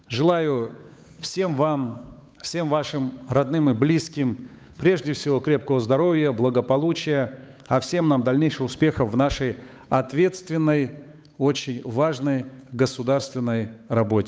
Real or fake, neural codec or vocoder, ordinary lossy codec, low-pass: fake; codec, 16 kHz, 8 kbps, FunCodec, trained on Chinese and English, 25 frames a second; none; none